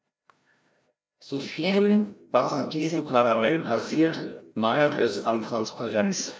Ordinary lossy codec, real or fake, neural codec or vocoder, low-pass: none; fake; codec, 16 kHz, 0.5 kbps, FreqCodec, larger model; none